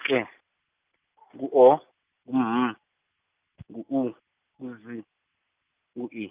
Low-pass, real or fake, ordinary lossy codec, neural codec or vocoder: 3.6 kHz; real; Opus, 32 kbps; none